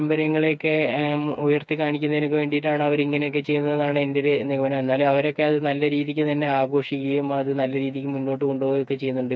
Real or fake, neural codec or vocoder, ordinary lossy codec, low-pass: fake; codec, 16 kHz, 4 kbps, FreqCodec, smaller model; none; none